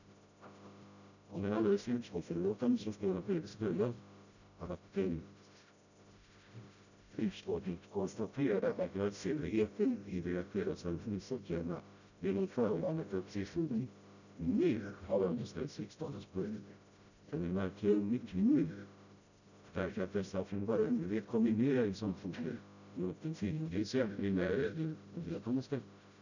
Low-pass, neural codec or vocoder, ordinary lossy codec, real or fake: 7.2 kHz; codec, 16 kHz, 0.5 kbps, FreqCodec, smaller model; none; fake